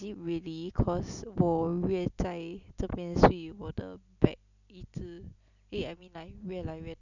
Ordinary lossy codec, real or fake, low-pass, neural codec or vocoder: none; real; 7.2 kHz; none